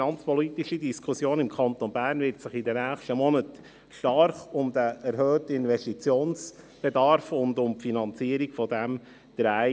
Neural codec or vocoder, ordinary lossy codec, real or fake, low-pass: none; none; real; none